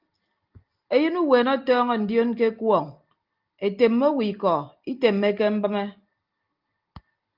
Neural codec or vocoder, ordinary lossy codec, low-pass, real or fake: none; Opus, 32 kbps; 5.4 kHz; real